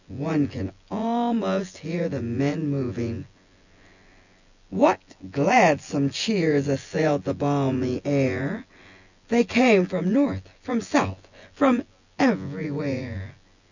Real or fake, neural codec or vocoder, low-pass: fake; vocoder, 24 kHz, 100 mel bands, Vocos; 7.2 kHz